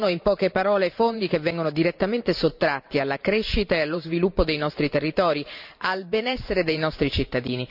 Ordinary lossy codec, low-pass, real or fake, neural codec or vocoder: none; 5.4 kHz; fake; vocoder, 44.1 kHz, 128 mel bands every 512 samples, BigVGAN v2